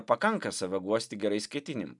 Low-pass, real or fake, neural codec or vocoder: 10.8 kHz; real; none